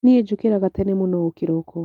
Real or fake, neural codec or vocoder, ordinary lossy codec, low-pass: real; none; Opus, 32 kbps; 19.8 kHz